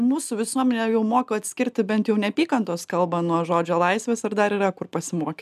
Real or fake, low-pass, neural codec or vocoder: real; 14.4 kHz; none